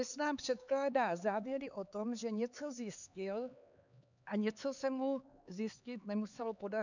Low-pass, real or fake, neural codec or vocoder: 7.2 kHz; fake; codec, 16 kHz, 4 kbps, X-Codec, HuBERT features, trained on LibriSpeech